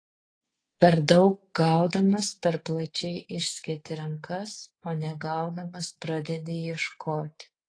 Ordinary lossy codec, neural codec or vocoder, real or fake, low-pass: AAC, 32 kbps; codec, 24 kHz, 3.1 kbps, DualCodec; fake; 9.9 kHz